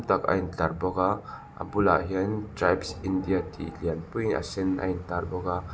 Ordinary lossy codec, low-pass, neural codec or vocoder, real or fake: none; none; none; real